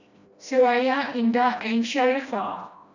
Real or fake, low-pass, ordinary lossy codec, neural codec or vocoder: fake; 7.2 kHz; none; codec, 16 kHz, 1 kbps, FreqCodec, smaller model